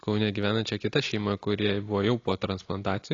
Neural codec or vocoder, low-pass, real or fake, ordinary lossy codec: none; 7.2 kHz; real; AAC, 32 kbps